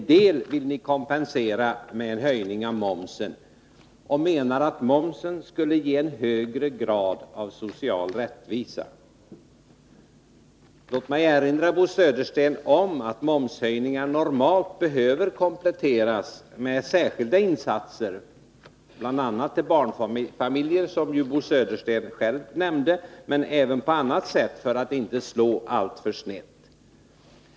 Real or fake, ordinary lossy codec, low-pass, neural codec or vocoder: real; none; none; none